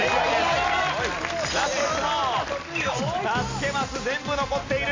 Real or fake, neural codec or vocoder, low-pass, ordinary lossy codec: real; none; 7.2 kHz; AAC, 32 kbps